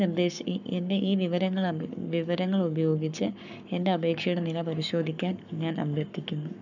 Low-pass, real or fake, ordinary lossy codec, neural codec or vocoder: 7.2 kHz; fake; none; codec, 44.1 kHz, 7.8 kbps, Pupu-Codec